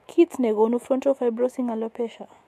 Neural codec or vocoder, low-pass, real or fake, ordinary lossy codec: autoencoder, 48 kHz, 128 numbers a frame, DAC-VAE, trained on Japanese speech; 14.4 kHz; fake; MP3, 64 kbps